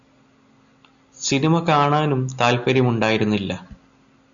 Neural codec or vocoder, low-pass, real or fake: none; 7.2 kHz; real